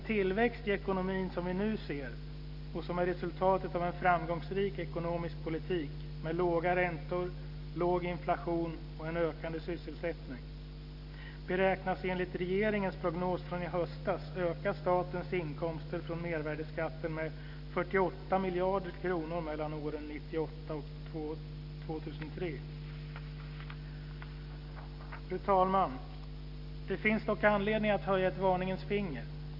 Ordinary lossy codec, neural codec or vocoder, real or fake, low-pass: none; none; real; 5.4 kHz